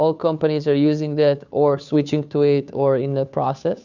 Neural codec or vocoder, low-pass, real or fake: codec, 16 kHz, 2 kbps, FunCodec, trained on Chinese and English, 25 frames a second; 7.2 kHz; fake